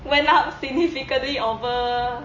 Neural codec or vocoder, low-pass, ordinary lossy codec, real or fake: none; 7.2 kHz; MP3, 32 kbps; real